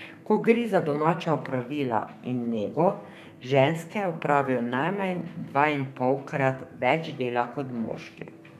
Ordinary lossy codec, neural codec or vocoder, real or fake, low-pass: none; codec, 32 kHz, 1.9 kbps, SNAC; fake; 14.4 kHz